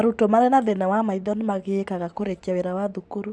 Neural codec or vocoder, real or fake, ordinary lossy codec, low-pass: none; real; none; none